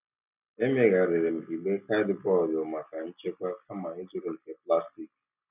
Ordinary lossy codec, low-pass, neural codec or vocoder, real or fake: none; 3.6 kHz; none; real